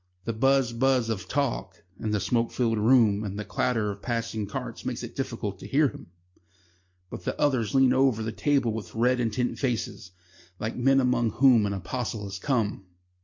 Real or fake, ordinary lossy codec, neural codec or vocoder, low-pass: real; MP3, 48 kbps; none; 7.2 kHz